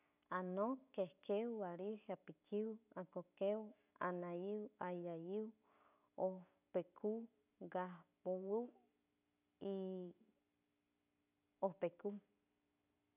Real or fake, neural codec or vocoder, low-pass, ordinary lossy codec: real; none; 3.6 kHz; none